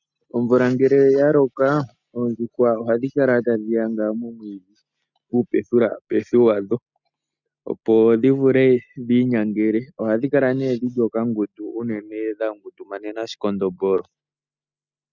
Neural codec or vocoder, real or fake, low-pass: none; real; 7.2 kHz